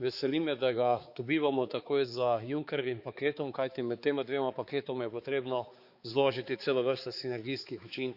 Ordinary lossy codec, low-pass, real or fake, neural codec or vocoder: Opus, 64 kbps; 5.4 kHz; fake; codec, 16 kHz, 4 kbps, X-Codec, HuBERT features, trained on balanced general audio